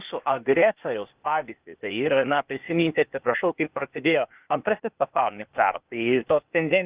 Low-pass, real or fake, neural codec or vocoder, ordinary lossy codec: 3.6 kHz; fake; codec, 16 kHz, 0.8 kbps, ZipCodec; Opus, 32 kbps